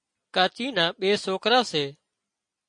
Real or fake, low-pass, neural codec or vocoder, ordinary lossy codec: real; 9.9 kHz; none; MP3, 48 kbps